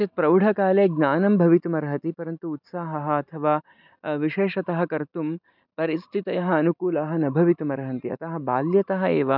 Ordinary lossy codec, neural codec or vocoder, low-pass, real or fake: none; none; 5.4 kHz; real